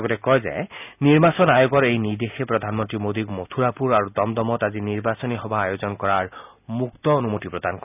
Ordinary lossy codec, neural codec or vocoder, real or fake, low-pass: none; none; real; 3.6 kHz